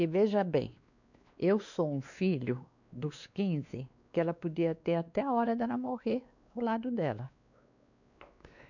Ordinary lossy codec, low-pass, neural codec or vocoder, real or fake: none; 7.2 kHz; codec, 16 kHz, 2 kbps, X-Codec, WavLM features, trained on Multilingual LibriSpeech; fake